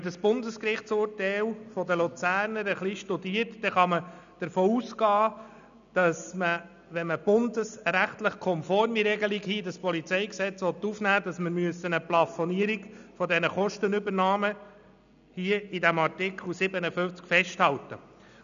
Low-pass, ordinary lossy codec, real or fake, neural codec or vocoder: 7.2 kHz; none; real; none